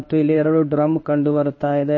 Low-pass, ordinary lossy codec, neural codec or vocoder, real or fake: 7.2 kHz; MP3, 32 kbps; codec, 16 kHz in and 24 kHz out, 1 kbps, XY-Tokenizer; fake